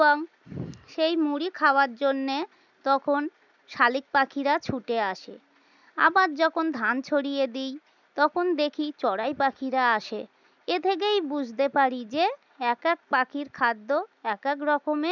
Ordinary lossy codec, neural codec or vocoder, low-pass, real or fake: none; none; 7.2 kHz; real